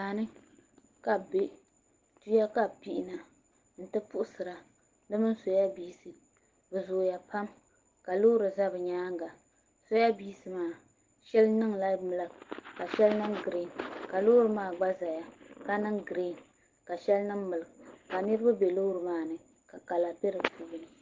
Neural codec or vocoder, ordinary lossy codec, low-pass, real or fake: none; Opus, 16 kbps; 7.2 kHz; real